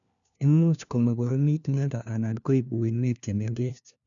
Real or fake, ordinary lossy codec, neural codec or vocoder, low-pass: fake; none; codec, 16 kHz, 1 kbps, FunCodec, trained on LibriTTS, 50 frames a second; 7.2 kHz